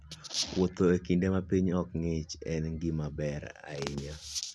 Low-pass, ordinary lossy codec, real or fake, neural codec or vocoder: none; none; real; none